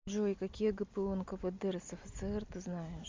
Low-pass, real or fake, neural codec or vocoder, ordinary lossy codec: 7.2 kHz; real; none; MP3, 64 kbps